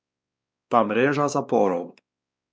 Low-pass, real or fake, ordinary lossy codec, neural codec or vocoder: none; fake; none; codec, 16 kHz, 2 kbps, X-Codec, WavLM features, trained on Multilingual LibriSpeech